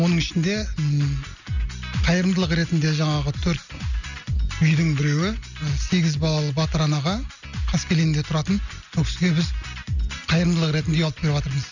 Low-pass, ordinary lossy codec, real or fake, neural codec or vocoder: 7.2 kHz; none; real; none